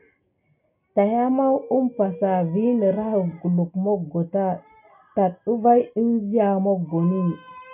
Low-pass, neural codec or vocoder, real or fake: 3.6 kHz; none; real